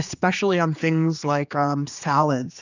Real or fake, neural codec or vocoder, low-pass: fake; codec, 16 kHz, 2 kbps, X-Codec, HuBERT features, trained on general audio; 7.2 kHz